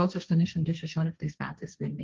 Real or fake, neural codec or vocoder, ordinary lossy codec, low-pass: fake; codec, 16 kHz, 1.1 kbps, Voila-Tokenizer; Opus, 32 kbps; 7.2 kHz